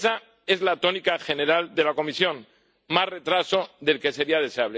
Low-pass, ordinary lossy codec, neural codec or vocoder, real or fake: none; none; none; real